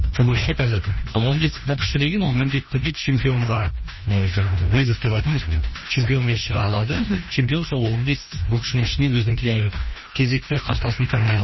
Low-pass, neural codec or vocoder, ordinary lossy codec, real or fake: 7.2 kHz; codec, 16 kHz, 1 kbps, FreqCodec, larger model; MP3, 24 kbps; fake